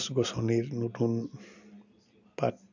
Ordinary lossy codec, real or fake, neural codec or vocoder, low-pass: none; real; none; 7.2 kHz